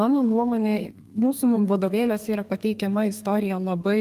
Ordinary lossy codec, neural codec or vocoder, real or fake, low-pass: Opus, 32 kbps; codec, 32 kHz, 1.9 kbps, SNAC; fake; 14.4 kHz